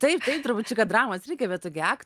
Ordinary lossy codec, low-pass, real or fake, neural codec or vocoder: Opus, 32 kbps; 14.4 kHz; real; none